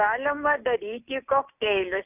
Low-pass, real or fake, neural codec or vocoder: 3.6 kHz; real; none